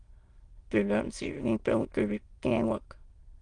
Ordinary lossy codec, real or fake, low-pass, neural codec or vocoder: Opus, 24 kbps; fake; 9.9 kHz; autoencoder, 22.05 kHz, a latent of 192 numbers a frame, VITS, trained on many speakers